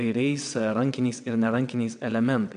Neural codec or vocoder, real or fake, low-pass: vocoder, 22.05 kHz, 80 mel bands, WaveNeXt; fake; 9.9 kHz